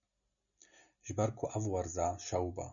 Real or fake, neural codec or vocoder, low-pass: real; none; 7.2 kHz